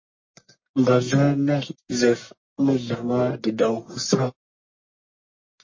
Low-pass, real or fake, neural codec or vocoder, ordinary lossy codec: 7.2 kHz; fake; codec, 44.1 kHz, 1.7 kbps, Pupu-Codec; MP3, 32 kbps